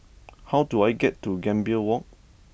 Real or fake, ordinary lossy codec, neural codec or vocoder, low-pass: real; none; none; none